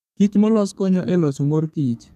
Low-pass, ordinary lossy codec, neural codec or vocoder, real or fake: 14.4 kHz; none; codec, 32 kHz, 1.9 kbps, SNAC; fake